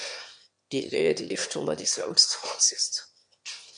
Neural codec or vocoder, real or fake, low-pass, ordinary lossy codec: autoencoder, 22.05 kHz, a latent of 192 numbers a frame, VITS, trained on one speaker; fake; 9.9 kHz; MP3, 64 kbps